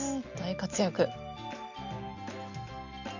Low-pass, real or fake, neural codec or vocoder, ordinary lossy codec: 7.2 kHz; real; none; Opus, 64 kbps